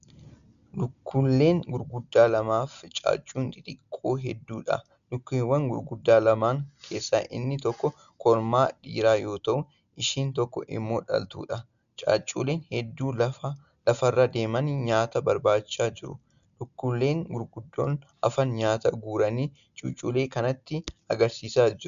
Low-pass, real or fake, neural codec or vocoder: 7.2 kHz; real; none